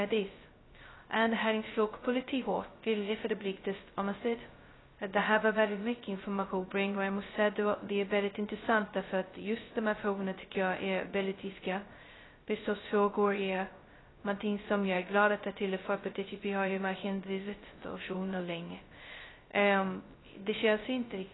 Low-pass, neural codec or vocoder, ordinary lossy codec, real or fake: 7.2 kHz; codec, 16 kHz, 0.2 kbps, FocalCodec; AAC, 16 kbps; fake